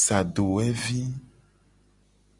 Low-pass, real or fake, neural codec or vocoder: 10.8 kHz; real; none